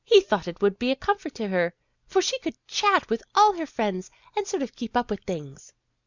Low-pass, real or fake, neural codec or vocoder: 7.2 kHz; real; none